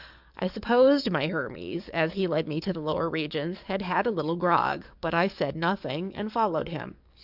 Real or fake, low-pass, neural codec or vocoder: fake; 5.4 kHz; codec, 44.1 kHz, 7.8 kbps, DAC